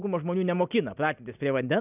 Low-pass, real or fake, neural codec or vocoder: 3.6 kHz; real; none